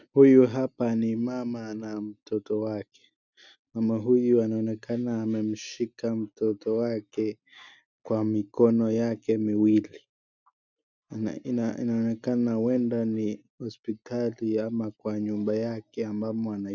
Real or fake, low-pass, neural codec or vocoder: real; 7.2 kHz; none